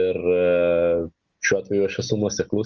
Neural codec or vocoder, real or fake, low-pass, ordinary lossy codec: none; real; 7.2 kHz; Opus, 32 kbps